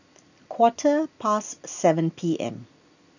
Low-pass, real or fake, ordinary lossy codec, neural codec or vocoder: 7.2 kHz; real; none; none